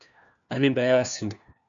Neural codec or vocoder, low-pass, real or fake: codec, 16 kHz, 1 kbps, FunCodec, trained on LibriTTS, 50 frames a second; 7.2 kHz; fake